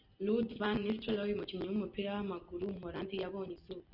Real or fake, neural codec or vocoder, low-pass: real; none; 5.4 kHz